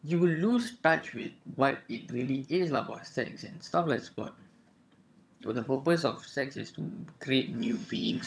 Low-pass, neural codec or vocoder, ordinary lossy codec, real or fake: none; vocoder, 22.05 kHz, 80 mel bands, HiFi-GAN; none; fake